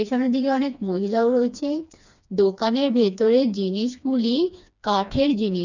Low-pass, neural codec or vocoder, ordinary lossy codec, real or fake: 7.2 kHz; codec, 16 kHz, 2 kbps, FreqCodec, smaller model; none; fake